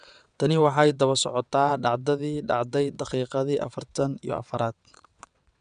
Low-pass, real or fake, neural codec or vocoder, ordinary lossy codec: 9.9 kHz; fake; vocoder, 22.05 kHz, 80 mel bands, WaveNeXt; none